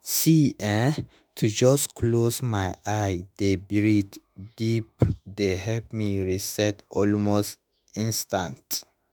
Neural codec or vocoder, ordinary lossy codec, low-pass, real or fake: autoencoder, 48 kHz, 32 numbers a frame, DAC-VAE, trained on Japanese speech; none; none; fake